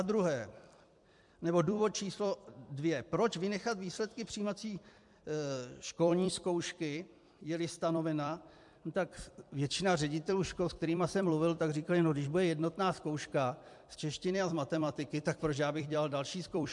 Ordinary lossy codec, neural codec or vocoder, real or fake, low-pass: MP3, 64 kbps; vocoder, 44.1 kHz, 128 mel bands every 256 samples, BigVGAN v2; fake; 10.8 kHz